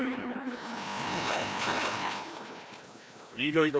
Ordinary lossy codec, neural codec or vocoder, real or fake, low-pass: none; codec, 16 kHz, 1 kbps, FreqCodec, larger model; fake; none